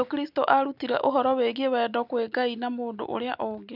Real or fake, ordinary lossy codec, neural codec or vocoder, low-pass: real; none; none; 5.4 kHz